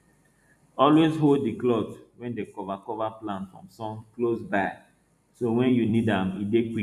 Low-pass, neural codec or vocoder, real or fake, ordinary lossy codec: 14.4 kHz; vocoder, 44.1 kHz, 128 mel bands every 256 samples, BigVGAN v2; fake; none